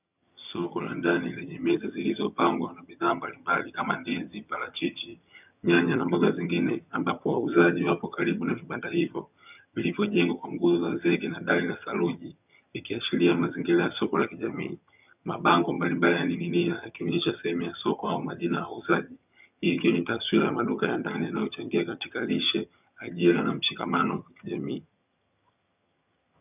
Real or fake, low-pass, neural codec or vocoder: fake; 3.6 kHz; vocoder, 22.05 kHz, 80 mel bands, HiFi-GAN